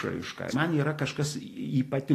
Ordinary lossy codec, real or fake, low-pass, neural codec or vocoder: AAC, 48 kbps; real; 14.4 kHz; none